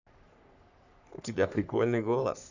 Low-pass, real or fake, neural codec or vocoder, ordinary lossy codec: 7.2 kHz; fake; codec, 44.1 kHz, 3.4 kbps, Pupu-Codec; none